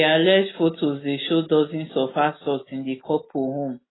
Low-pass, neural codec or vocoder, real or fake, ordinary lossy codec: 7.2 kHz; none; real; AAC, 16 kbps